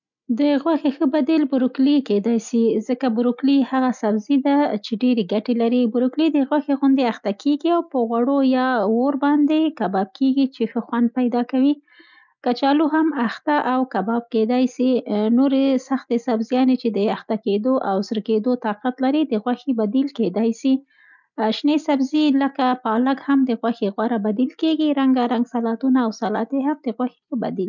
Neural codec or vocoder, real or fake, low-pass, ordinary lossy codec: none; real; 7.2 kHz; none